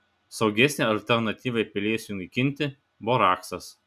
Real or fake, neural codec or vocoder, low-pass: real; none; 14.4 kHz